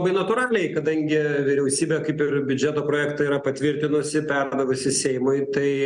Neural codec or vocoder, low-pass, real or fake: none; 9.9 kHz; real